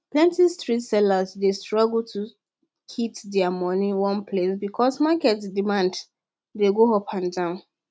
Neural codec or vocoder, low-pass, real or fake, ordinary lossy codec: none; none; real; none